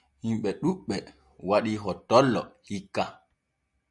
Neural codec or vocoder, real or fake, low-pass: none; real; 10.8 kHz